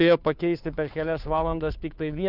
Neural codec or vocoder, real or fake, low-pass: codec, 16 kHz, 2 kbps, FunCodec, trained on Chinese and English, 25 frames a second; fake; 5.4 kHz